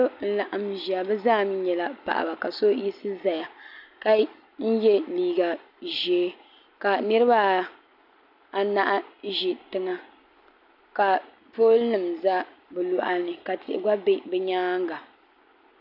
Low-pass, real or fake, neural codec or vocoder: 5.4 kHz; real; none